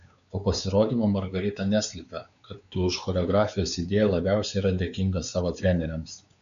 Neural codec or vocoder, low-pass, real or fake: codec, 16 kHz, 4 kbps, X-Codec, WavLM features, trained on Multilingual LibriSpeech; 7.2 kHz; fake